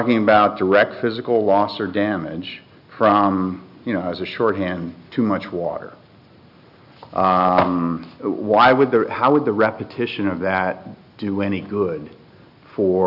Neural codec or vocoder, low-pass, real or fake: none; 5.4 kHz; real